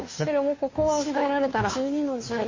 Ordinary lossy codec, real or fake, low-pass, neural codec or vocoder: MP3, 32 kbps; fake; 7.2 kHz; codec, 16 kHz, 2 kbps, FunCodec, trained on Chinese and English, 25 frames a second